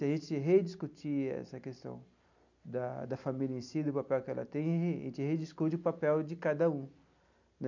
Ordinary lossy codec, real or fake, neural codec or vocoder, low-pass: none; real; none; 7.2 kHz